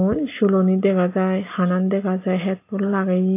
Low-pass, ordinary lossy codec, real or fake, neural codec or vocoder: 3.6 kHz; AAC, 24 kbps; real; none